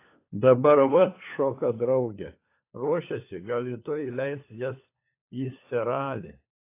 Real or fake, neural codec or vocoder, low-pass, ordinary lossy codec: fake; codec, 16 kHz, 4 kbps, FunCodec, trained on LibriTTS, 50 frames a second; 3.6 kHz; AAC, 24 kbps